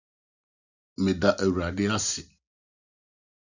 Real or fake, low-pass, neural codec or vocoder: real; 7.2 kHz; none